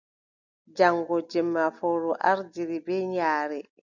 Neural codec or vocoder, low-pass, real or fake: none; 7.2 kHz; real